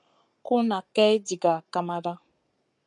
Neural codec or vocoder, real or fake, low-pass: codec, 44.1 kHz, 7.8 kbps, Pupu-Codec; fake; 10.8 kHz